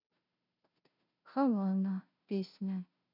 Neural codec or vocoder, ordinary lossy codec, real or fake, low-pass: codec, 16 kHz, 0.5 kbps, FunCodec, trained on Chinese and English, 25 frames a second; none; fake; 5.4 kHz